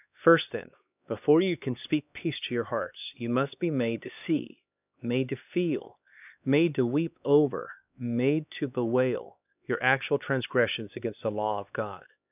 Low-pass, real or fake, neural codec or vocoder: 3.6 kHz; fake; codec, 16 kHz, 2 kbps, X-Codec, HuBERT features, trained on LibriSpeech